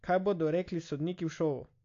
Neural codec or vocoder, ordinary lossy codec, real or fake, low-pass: none; AAC, 48 kbps; real; 7.2 kHz